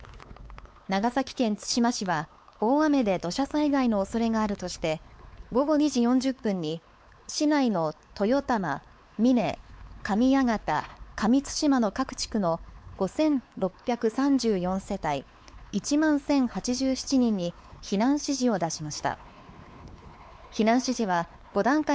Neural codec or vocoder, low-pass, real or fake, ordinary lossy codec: codec, 16 kHz, 4 kbps, X-Codec, WavLM features, trained on Multilingual LibriSpeech; none; fake; none